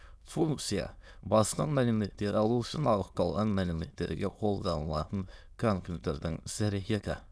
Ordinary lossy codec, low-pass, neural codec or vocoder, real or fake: none; none; autoencoder, 22.05 kHz, a latent of 192 numbers a frame, VITS, trained on many speakers; fake